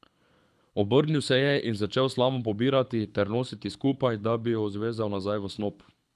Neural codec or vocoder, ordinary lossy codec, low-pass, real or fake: codec, 24 kHz, 6 kbps, HILCodec; none; none; fake